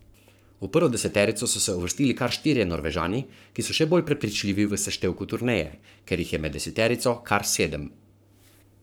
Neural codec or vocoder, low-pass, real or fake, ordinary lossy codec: codec, 44.1 kHz, 7.8 kbps, Pupu-Codec; none; fake; none